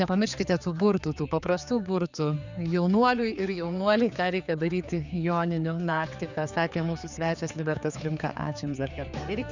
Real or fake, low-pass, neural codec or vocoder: fake; 7.2 kHz; codec, 16 kHz, 4 kbps, X-Codec, HuBERT features, trained on general audio